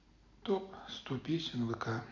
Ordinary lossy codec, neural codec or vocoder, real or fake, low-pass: none; none; real; 7.2 kHz